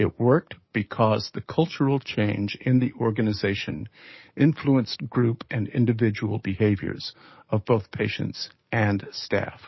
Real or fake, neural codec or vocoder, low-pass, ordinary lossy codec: fake; codec, 44.1 kHz, 7.8 kbps, DAC; 7.2 kHz; MP3, 24 kbps